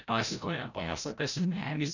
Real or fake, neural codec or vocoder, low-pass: fake; codec, 16 kHz, 0.5 kbps, FreqCodec, larger model; 7.2 kHz